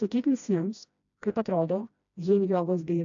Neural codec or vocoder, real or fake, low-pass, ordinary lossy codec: codec, 16 kHz, 1 kbps, FreqCodec, smaller model; fake; 7.2 kHz; AAC, 64 kbps